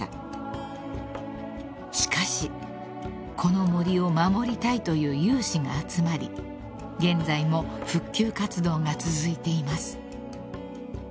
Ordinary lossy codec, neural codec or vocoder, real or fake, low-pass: none; none; real; none